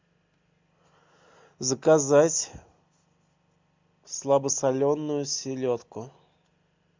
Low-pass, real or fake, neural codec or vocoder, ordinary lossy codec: 7.2 kHz; real; none; MP3, 64 kbps